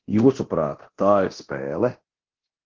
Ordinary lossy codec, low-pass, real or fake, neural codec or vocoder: Opus, 16 kbps; 7.2 kHz; fake; codec, 24 kHz, 0.9 kbps, DualCodec